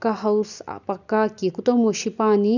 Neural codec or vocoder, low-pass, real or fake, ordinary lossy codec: none; 7.2 kHz; real; none